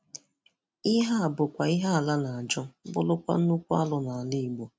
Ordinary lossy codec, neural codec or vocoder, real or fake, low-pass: none; none; real; none